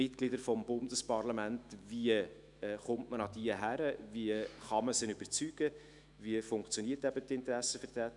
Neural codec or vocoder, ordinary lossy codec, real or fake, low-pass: autoencoder, 48 kHz, 128 numbers a frame, DAC-VAE, trained on Japanese speech; none; fake; 10.8 kHz